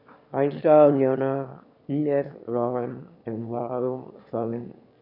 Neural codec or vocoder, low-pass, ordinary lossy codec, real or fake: autoencoder, 22.05 kHz, a latent of 192 numbers a frame, VITS, trained on one speaker; 5.4 kHz; none; fake